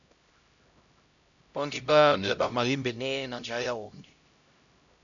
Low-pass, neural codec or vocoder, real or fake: 7.2 kHz; codec, 16 kHz, 0.5 kbps, X-Codec, HuBERT features, trained on LibriSpeech; fake